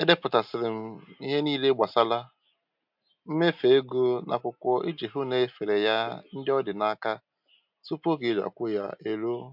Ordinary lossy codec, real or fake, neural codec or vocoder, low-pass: MP3, 48 kbps; real; none; 5.4 kHz